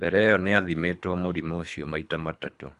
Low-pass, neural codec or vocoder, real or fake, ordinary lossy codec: 10.8 kHz; codec, 24 kHz, 3 kbps, HILCodec; fake; none